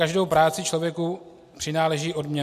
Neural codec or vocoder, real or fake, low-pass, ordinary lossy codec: none; real; 14.4 kHz; MP3, 64 kbps